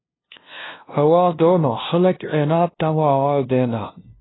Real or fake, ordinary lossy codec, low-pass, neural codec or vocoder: fake; AAC, 16 kbps; 7.2 kHz; codec, 16 kHz, 0.5 kbps, FunCodec, trained on LibriTTS, 25 frames a second